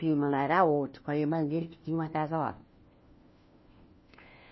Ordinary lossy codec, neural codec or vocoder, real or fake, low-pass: MP3, 24 kbps; codec, 16 kHz, 1 kbps, FunCodec, trained on LibriTTS, 50 frames a second; fake; 7.2 kHz